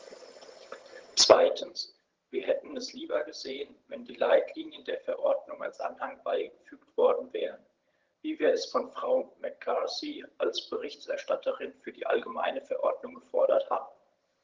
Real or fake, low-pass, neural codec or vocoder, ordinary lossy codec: fake; 7.2 kHz; vocoder, 22.05 kHz, 80 mel bands, HiFi-GAN; Opus, 16 kbps